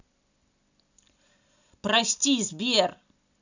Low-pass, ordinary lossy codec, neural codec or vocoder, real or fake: 7.2 kHz; none; none; real